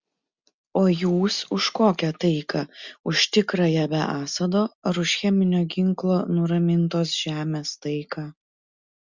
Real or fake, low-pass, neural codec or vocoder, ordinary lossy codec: real; 7.2 kHz; none; Opus, 64 kbps